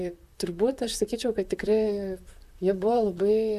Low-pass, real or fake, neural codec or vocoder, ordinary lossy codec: 14.4 kHz; fake; vocoder, 44.1 kHz, 128 mel bands, Pupu-Vocoder; MP3, 96 kbps